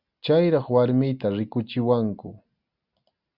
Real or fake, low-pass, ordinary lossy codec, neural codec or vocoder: real; 5.4 kHz; Opus, 64 kbps; none